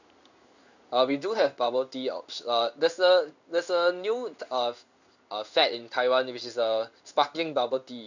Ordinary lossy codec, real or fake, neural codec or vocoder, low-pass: none; fake; codec, 16 kHz in and 24 kHz out, 1 kbps, XY-Tokenizer; 7.2 kHz